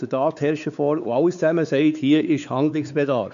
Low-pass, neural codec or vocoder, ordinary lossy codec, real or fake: 7.2 kHz; codec, 16 kHz, 4 kbps, X-Codec, WavLM features, trained on Multilingual LibriSpeech; none; fake